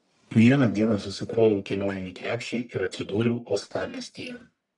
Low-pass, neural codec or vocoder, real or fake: 10.8 kHz; codec, 44.1 kHz, 1.7 kbps, Pupu-Codec; fake